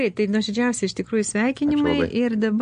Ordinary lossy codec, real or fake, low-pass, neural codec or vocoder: MP3, 48 kbps; real; 9.9 kHz; none